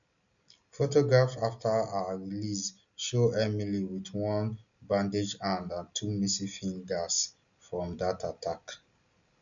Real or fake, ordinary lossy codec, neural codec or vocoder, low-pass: real; none; none; 7.2 kHz